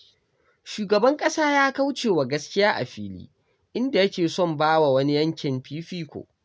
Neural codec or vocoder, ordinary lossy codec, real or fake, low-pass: none; none; real; none